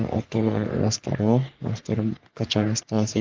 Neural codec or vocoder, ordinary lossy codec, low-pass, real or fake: codec, 44.1 kHz, 3.4 kbps, Pupu-Codec; Opus, 32 kbps; 7.2 kHz; fake